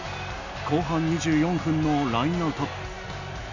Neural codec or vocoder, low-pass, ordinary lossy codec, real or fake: none; 7.2 kHz; none; real